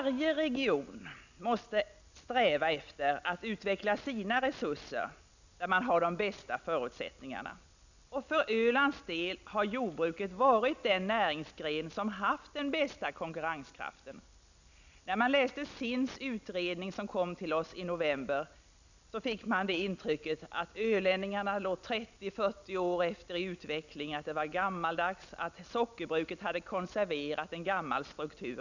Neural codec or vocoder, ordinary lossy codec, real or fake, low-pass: none; none; real; 7.2 kHz